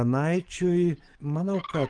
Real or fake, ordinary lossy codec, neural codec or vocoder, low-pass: fake; Opus, 24 kbps; codec, 44.1 kHz, 7.8 kbps, DAC; 9.9 kHz